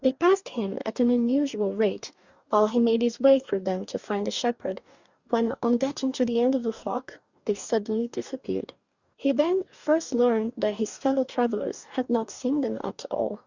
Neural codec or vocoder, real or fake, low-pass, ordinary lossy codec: codec, 44.1 kHz, 2.6 kbps, DAC; fake; 7.2 kHz; Opus, 64 kbps